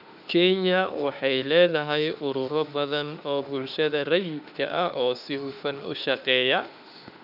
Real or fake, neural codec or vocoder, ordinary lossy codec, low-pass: fake; autoencoder, 48 kHz, 32 numbers a frame, DAC-VAE, trained on Japanese speech; none; 5.4 kHz